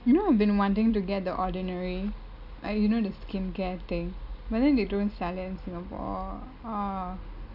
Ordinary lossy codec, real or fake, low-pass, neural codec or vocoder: none; real; 5.4 kHz; none